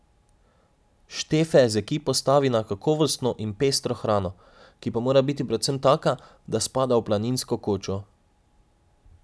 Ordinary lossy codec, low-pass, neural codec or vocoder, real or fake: none; none; none; real